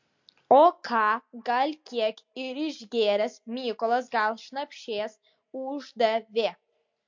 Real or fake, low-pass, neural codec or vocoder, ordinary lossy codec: real; 7.2 kHz; none; MP3, 48 kbps